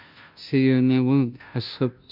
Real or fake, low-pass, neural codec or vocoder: fake; 5.4 kHz; codec, 16 kHz, 0.5 kbps, FunCodec, trained on Chinese and English, 25 frames a second